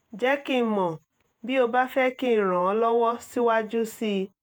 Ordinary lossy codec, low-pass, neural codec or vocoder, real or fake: none; none; vocoder, 48 kHz, 128 mel bands, Vocos; fake